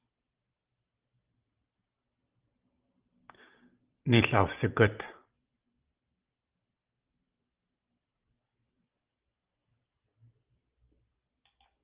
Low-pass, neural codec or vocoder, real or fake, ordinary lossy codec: 3.6 kHz; none; real; Opus, 32 kbps